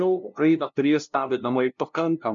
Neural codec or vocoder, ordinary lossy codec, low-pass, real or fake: codec, 16 kHz, 0.5 kbps, FunCodec, trained on LibriTTS, 25 frames a second; MP3, 64 kbps; 7.2 kHz; fake